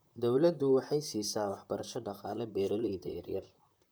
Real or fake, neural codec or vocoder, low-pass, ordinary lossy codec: fake; vocoder, 44.1 kHz, 128 mel bands, Pupu-Vocoder; none; none